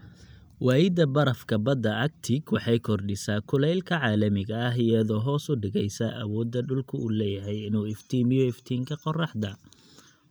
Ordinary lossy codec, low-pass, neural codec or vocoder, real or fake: none; none; none; real